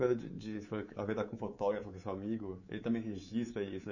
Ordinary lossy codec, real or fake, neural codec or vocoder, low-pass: none; fake; codec, 24 kHz, 3.1 kbps, DualCodec; 7.2 kHz